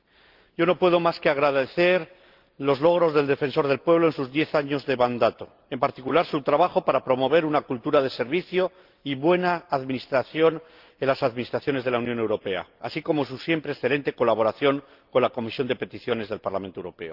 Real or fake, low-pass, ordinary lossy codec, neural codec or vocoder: real; 5.4 kHz; Opus, 32 kbps; none